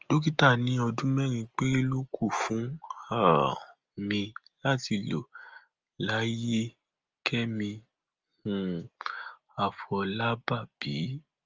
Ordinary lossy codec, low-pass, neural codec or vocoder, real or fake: Opus, 24 kbps; 7.2 kHz; none; real